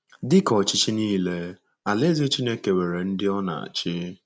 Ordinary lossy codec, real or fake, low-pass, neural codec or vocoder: none; real; none; none